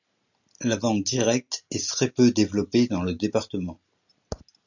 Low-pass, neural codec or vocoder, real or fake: 7.2 kHz; none; real